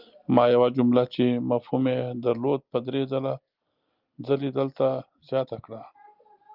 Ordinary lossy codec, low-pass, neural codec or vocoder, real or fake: Opus, 24 kbps; 5.4 kHz; none; real